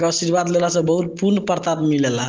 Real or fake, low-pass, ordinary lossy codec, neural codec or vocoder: real; 7.2 kHz; Opus, 16 kbps; none